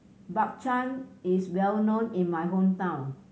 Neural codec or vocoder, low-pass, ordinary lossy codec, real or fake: none; none; none; real